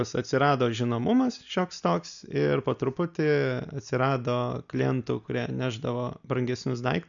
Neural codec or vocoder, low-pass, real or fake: none; 7.2 kHz; real